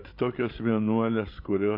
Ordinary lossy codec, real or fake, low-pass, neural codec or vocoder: AAC, 32 kbps; fake; 5.4 kHz; codec, 16 kHz, 16 kbps, FreqCodec, larger model